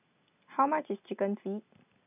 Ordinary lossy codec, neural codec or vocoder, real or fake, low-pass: none; none; real; 3.6 kHz